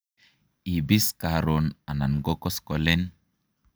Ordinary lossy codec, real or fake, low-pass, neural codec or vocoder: none; real; none; none